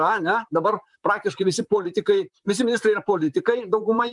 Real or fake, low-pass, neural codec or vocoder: fake; 10.8 kHz; vocoder, 44.1 kHz, 128 mel bands, Pupu-Vocoder